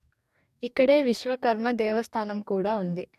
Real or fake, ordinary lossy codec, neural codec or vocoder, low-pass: fake; none; codec, 44.1 kHz, 2.6 kbps, DAC; 14.4 kHz